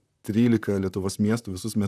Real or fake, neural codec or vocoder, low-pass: fake; vocoder, 44.1 kHz, 128 mel bands, Pupu-Vocoder; 14.4 kHz